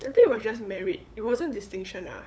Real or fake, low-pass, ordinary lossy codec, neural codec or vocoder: fake; none; none; codec, 16 kHz, 8 kbps, FunCodec, trained on LibriTTS, 25 frames a second